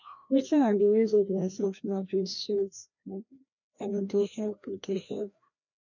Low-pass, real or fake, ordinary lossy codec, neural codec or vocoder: 7.2 kHz; fake; none; codec, 16 kHz, 1 kbps, FreqCodec, larger model